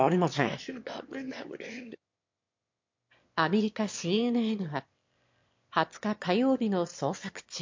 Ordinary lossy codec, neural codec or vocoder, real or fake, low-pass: MP3, 48 kbps; autoencoder, 22.05 kHz, a latent of 192 numbers a frame, VITS, trained on one speaker; fake; 7.2 kHz